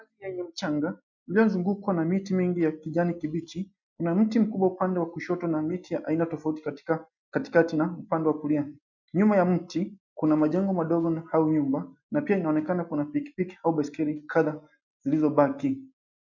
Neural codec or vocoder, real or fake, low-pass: none; real; 7.2 kHz